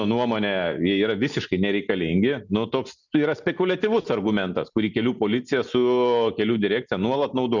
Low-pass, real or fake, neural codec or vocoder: 7.2 kHz; real; none